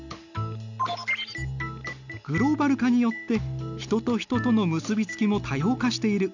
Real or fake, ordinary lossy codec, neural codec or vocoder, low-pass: real; none; none; 7.2 kHz